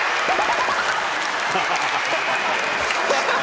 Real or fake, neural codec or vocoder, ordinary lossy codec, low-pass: real; none; none; none